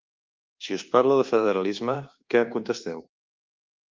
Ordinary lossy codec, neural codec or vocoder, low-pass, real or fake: Opus, 24 kbps; codec, 24 kHz, 1.2 kbps, DualCodec; 7.2 kHz; fake